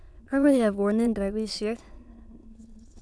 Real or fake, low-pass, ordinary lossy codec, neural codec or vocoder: fake; none; none; autoencoder, 22.05 kHz, a latent of 192 numbers a frame, VITS, trained on many speakers